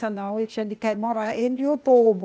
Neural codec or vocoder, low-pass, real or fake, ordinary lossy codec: codec, 16 kHz, 0.8 kbps, ZipCodec; none; fake; none